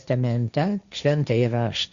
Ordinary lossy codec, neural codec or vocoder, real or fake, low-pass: Opus, 64 kbps; codec, 16 kHz, 1.1 kbps, Voila-Tokenizer; fake; 7.2 kHz